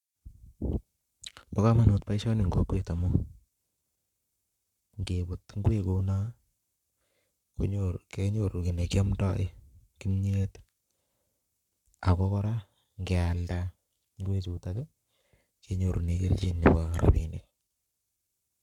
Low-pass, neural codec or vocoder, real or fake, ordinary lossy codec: 19.8 kHz; codec, 44.1 kHz, 7.8 kbps, Pupu-Codec; fake; none